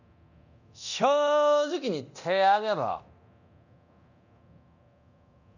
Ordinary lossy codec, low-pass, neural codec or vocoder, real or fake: none; 7.2 kHz; codec, 24 kHz, 0.9 kbps, DualCodec; fake